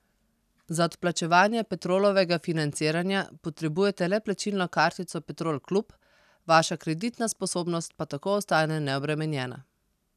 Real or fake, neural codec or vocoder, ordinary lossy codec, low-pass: real; none; none; 14.4 kHz